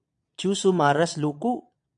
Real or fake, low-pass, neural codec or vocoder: fake; 9.9 kHz; vocoder, 22.05 kHz, 80 mel bands, Vocos